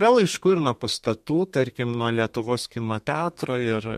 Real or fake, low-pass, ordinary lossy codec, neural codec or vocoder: fake; 14.4 kHz; MP3, 64 kbps; codec, 32 kHz, 1.9 kbps, SNAC